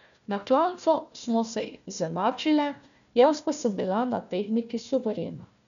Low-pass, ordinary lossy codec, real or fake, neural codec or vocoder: 7.2 kHz; none; fake; codec, 16 kHz, 1 kbps, FunCodec, trained on Chinese and English, 50 frames a second